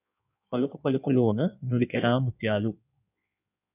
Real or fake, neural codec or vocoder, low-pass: fake; codec, 16 kHz in and 24 kHz out, 1.1 kbps, FireRedTTS-2 codec; 3.6 kHz